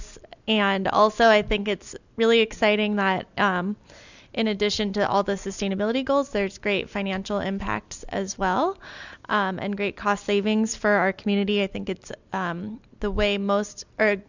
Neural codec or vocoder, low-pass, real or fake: none; 7.2 kHz; real